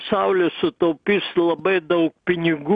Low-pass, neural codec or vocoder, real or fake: 9.9 kHz; none; real